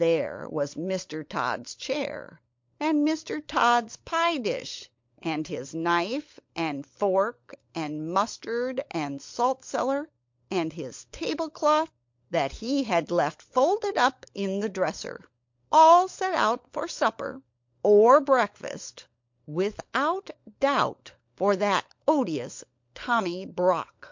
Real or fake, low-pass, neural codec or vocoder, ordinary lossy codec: fake; 7.2 kHz; codec, 16 kHz, 16 kbps, FunCodec, trained on LibriTTS, 50 frames a second; MP3, 48 kbps